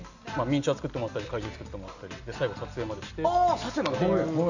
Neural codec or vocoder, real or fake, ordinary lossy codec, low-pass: none; real; none; 7.2 kHz